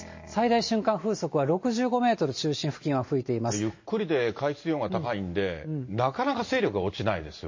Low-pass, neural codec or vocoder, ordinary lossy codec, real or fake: 7.2 kHz; none; MP3, 32 kbps; real